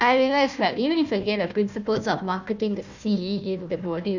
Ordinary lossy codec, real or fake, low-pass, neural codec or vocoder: none; fake; 7.2 kHz; codec, 16 kHz, 1 kbps, FunCodec, trained on Chinese and English, 50 frames a second